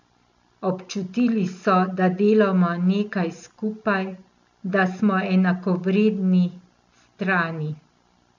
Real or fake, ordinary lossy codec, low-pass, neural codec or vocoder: real; none; 7.2 kHz; none